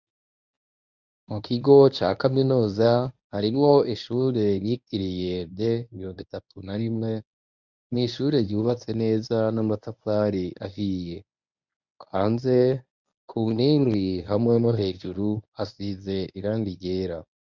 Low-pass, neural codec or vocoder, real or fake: 7.2 kHz; codec, 24 kHz, 0.9 kbps, WavTokenizer, medium speech release version 1; fake